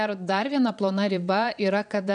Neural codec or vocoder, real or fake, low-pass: vocoder, 22.05 kHz, 80 mel bands, Vocos; fake; 9.9 kHz